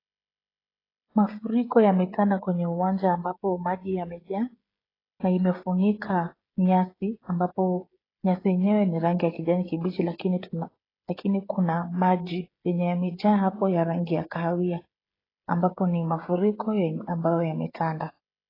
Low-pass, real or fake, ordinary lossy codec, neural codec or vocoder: 5.4 kHz; fake; AAC, 24 kbps; codec, 16 kHz, 16 kbps, FreqCodec, smaller model